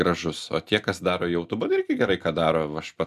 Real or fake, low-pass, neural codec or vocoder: real; 14.4 kHz; none